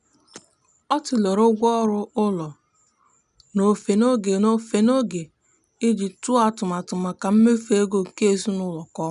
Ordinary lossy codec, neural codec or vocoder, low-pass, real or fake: none; none; 10.8 kHz; real